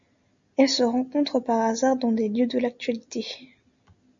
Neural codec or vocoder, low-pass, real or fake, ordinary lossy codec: none; 7.2 kHz; real; MP3, 64 kbps